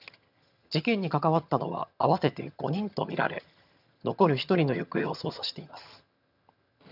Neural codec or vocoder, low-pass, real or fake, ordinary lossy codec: vocoder, 22.05 kHz, 80 mel bands, HiFi-GAN; 5.4 kHz; fake; none